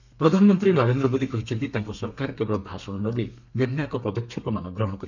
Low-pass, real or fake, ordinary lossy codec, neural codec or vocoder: 7.2 kHz; fake; none; codec, 32 kHz, 1.9 kbps, SNAC